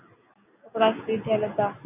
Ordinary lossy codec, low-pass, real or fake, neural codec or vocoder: AAC, 32 kbps; 3.6 kHz; real; none